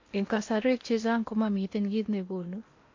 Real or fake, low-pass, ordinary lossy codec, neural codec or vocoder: fake; 7.2 kHz; MP3, 48 kbps; codec, 16 kHz in and 24 kHz out, 0.8 kbps, FocalCodec, streaming, 65536 codes